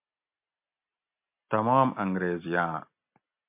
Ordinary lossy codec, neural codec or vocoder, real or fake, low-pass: MP3, 32 kbps; none; real; 3.6 kHz